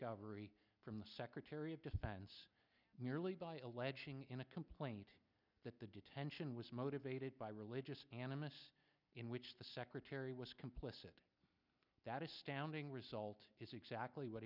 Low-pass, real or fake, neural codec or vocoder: 5.4 kHz; real; none